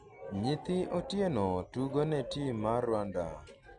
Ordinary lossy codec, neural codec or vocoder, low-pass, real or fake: none; none; 9.9 kHz; real